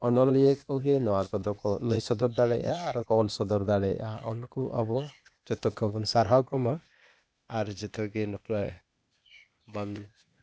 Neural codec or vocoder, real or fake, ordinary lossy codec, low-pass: codec, 16 kHz, 0.8 kbps, ZipCodec; fake; none; none